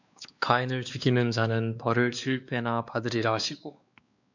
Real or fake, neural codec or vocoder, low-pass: fake; codec, 16 kHz, 2 kbps, X-Codec, WavLM features, trained on Multilingual LibriSpeech; 7.2 kHz